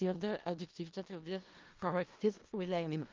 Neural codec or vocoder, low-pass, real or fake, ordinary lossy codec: codec, 16 kHz in and 24 kHz out, 0.4 kbps, LongCat-Audio-Codec, four codebook decoder; 7.2 kHz; fake; Opus, 32 kbps